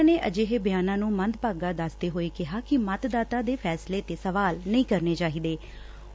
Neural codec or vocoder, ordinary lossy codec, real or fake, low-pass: none; none; real; none